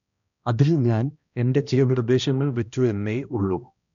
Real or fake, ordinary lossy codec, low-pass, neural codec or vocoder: fake; none; 7.2 kHz; codec, 16 kHz, 1 kbps, X-Codec, HuBERT features, trained on balanced general audio